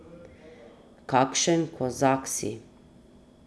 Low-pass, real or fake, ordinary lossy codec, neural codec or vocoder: none; real; none; none